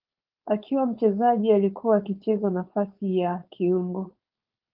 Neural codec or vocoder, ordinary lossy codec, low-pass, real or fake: codec, 16 kHz, 4.8 kbps, FACodec; Opus, 24 kbps; 5.4 kHz; fake